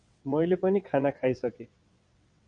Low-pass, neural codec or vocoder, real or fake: 9.9 kHz; vocoder, 22.05 kHz, 80 mel bands, WaveNeXt; fake